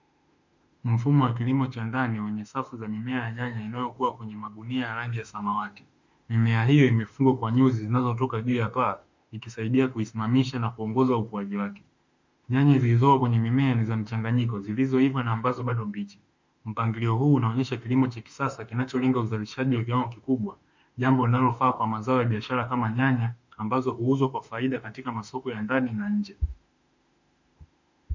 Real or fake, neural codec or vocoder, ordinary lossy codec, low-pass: fake; autoencoder, 48 kHz, 32 numbers a frame, DAC-VAE, trained on Japanese speech; MP3, 64 kbps; 7.2 kHz